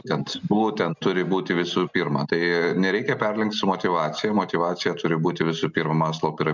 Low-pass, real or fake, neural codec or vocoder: 7.2 kHz; real; none